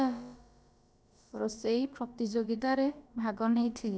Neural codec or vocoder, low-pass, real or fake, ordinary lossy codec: codec, 16 kHz, about 1 kbps, DyCAST, with the encoder's durations; none; fake; none